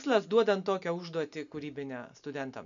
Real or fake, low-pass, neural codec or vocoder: real; 7.2 kHz; none